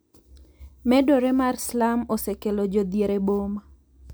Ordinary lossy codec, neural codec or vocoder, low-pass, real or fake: none; none; none; real